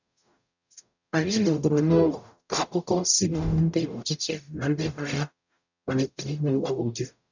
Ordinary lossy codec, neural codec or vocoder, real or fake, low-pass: none; codec, 44.1 kHz, 0.9 kbps, DAC; fake; 7.2 kHz